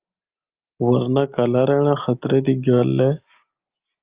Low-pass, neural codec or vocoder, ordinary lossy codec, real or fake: 3.6 kHz; none; Opus, 32 kbps; real